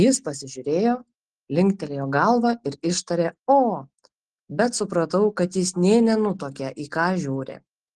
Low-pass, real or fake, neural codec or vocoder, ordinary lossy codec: 10.8 kHz; real; none; Opus, 16 kbps